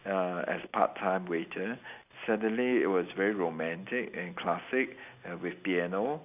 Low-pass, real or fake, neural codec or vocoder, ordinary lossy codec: 3.6 kHz; real; none; none